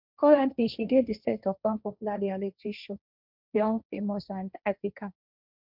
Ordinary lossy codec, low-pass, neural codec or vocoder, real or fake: none; 5.4 kHz; codec, 16 kHz, 1.1 kbps, Voila-Tokenizer; fake